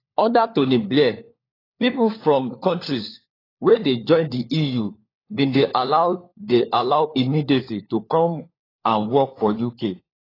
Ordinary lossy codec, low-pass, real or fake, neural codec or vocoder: AAC, 24 kbps; 5.4 kHz; fake; codec, 16 kHz, 4 kbps, FunCodec, trained on LibriTTS, 50 frames a second